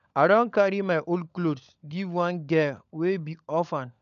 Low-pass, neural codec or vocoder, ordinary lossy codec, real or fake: 7.2 kHz; codec, 16 kHz, 16 kbps, FunCodec, trained on LibriTTS, 50 frames a second; none; fake